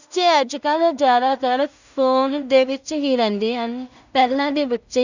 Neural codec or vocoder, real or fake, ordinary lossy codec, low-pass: codec, 16 kHz in and 24 kHz out, 0.4 kbps, LongCat-Audio-Codec, two codebook decoder; fake; none; 7.2 kHz